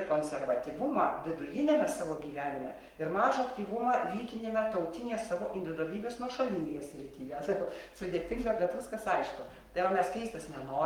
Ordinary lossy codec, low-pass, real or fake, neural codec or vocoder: Opus, 32 kbps; 19.8 kHz; fake; codec, 44.1 kHz, 7.8 kbps, Pupu-Codec